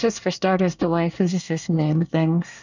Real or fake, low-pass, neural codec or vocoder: fake; 7.2 kHz; codec, 24 kHz, 1 kbps, SNAC